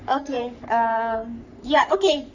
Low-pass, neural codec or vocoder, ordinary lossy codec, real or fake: 7.2 kHz; codec, 44.1 kHz, 3.4 kbps, Pupu-Codec; none; fake